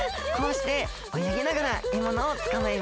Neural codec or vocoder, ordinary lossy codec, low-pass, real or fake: none; none; none; real